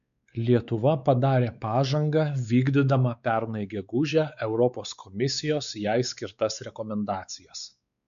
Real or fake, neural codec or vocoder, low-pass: fake; codec, 16 kHz, 4 kbps, X-Codec, WavLM features, trained on Multilingual LibriSpeech; 7.2 kHz